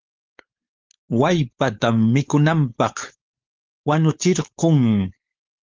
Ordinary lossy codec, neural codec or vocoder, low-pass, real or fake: Opus, 32 kbps; codec, 16 kHz, 4.8 kbps, FACodec; 7.2 kHz; fake